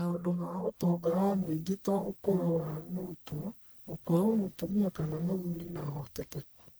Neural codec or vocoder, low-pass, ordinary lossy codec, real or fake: codec, 44.1 kHz, 1.7 kbps, Pupu-Codec; none; none; fake